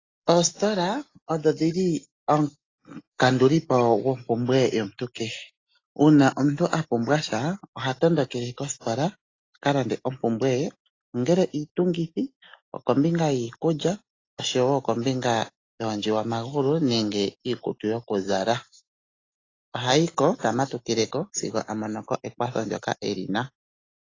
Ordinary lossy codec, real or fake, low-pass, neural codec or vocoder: AAC, 32 kbps; real; 7.2 kHz; none